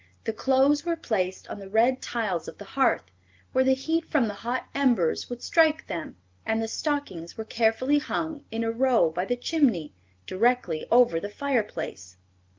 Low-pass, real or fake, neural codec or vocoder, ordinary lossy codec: 7.2 kHz; real; none; Opus, 32 kbps